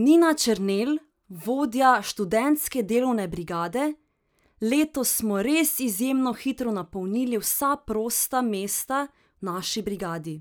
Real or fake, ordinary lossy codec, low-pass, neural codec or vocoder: real; none; none; none